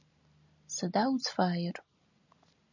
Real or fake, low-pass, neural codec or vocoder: real; 7.2 kHz; none